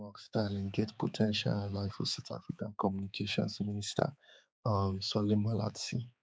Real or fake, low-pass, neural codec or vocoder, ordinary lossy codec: fake; none; codec, 16 kHz, 4 kbps, X-Codec, HuBERT features, trained on balanced general audio; none